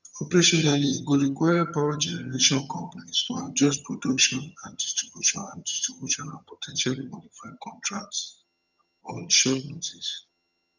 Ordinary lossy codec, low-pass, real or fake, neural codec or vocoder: none; 7.2 kHz; fake; vocoder, 22.05 kHz, 80 mel bands, HiFi-GAN